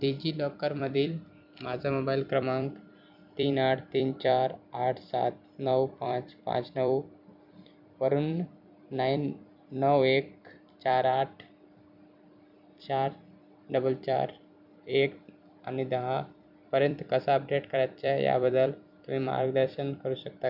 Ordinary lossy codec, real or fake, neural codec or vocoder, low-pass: none; fake; vocoder, 44.1 kHz, 128 mel bands every 256 samples, BigVGAN v2; 5.4 kHz